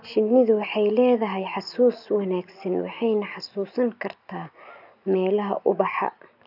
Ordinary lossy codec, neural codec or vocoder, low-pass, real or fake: none; none; 5.4 kHz; real